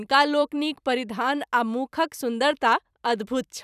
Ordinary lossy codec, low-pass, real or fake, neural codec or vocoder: none; 14.4 kHz; fake; vocoder, 44.1 kHz, 128 mel bands every 256 samples, BigVGAN v2